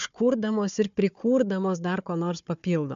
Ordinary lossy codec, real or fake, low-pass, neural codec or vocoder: MP3, 64 kbps; fake; 7.2 kHz; codec, 16 kHz, 4 kbps, FunCodec, trained on Chinese and English, 50 frames a second